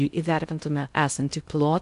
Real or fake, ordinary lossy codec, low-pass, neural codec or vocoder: fake; AAC, 96 kbps; 10.8 kHz; codec, 16 kHz in and 24 kHz out, 0.6 kbps, FocalCodec, streaming, 2048 codes